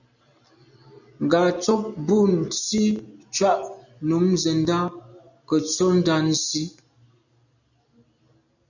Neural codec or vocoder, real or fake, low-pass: none; real; 7.2 kHz